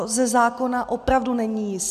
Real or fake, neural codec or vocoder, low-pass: real; none; 14.4 kHz